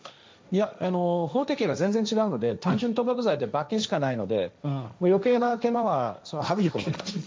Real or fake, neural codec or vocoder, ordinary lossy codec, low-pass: fake; codec, 16 kHz, 1.1 kbps, Voila-Tokenizer; none; none